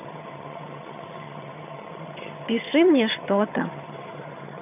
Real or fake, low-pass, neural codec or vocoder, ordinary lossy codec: fake; 3.6 kHz; vocoder, 22.05 kHz, 80 mel bands, HiFi-GAN; none